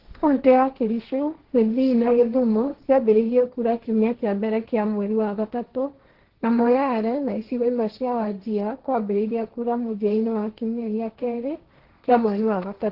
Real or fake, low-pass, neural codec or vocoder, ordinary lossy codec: fake; 5.4 kHz; codec, 16 kHz, 1.1 kbps, Voila-Tokenizer; Opus, 16 kbps